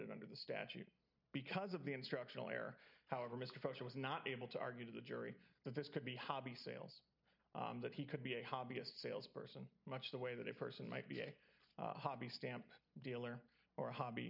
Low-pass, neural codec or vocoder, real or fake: 5.4 kHz; none; real